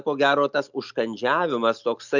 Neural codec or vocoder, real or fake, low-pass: none; real; 7.2 kHz